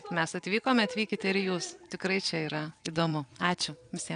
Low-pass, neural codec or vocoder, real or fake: 9.9 kHz; vocoder, 22.05 kHz, 80 mel bands, Vocos; fake